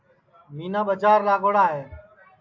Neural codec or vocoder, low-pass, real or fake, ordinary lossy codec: none; 7.2 kHz; real; AAC, 48 kbps